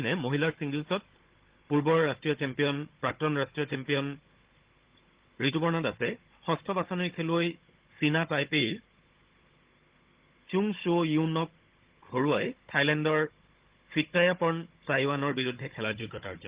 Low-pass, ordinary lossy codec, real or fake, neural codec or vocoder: 3.6 kHz; Opus, 16 kbps; fake; codec, 16 kHz, 16 kbps, FunCodec, trained on Chinese and English, 50 frames a second